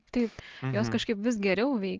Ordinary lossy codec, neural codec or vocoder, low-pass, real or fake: Opus, 16 kbps; none; 7.2 kHz; real